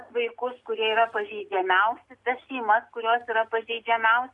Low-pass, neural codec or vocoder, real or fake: 10.8 kHz; none; real